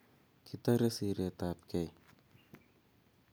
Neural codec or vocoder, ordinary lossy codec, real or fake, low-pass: none; none; real; none